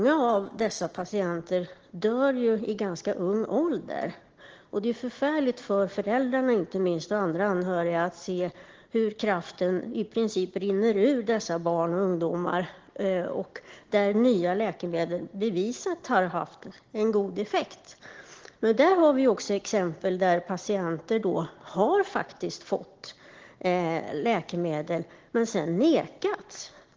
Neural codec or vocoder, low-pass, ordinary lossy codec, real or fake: none; 7.2 kHz; Opus, 16 kbps; real